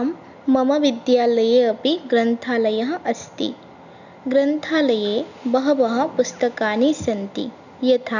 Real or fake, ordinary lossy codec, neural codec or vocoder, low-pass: real; none; none; 7.2 kHz